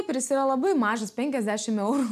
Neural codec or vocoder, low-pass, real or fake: none; 14.4 kHz; real